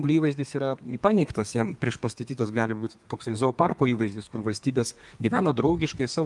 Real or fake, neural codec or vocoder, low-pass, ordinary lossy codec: fake; codec, 32 kHz, 1.9 kbps, SNAC; 10.8 kHz; Opus, 32 kbps